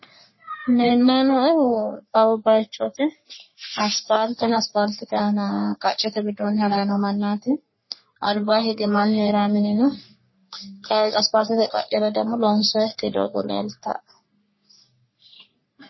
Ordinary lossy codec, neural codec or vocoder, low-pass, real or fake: MP3, 24 kbps; codec, 44.1 kHz, 3.4 kbps, Pupu-Codec; 7.2 kHz; fake